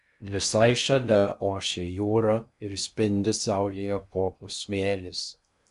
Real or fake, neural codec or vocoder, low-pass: fake; codec, 16 kHz in and 24 kHz out, 0.6 kbps, FocalCodec, streaming, 2048 codes; 10.8 kHz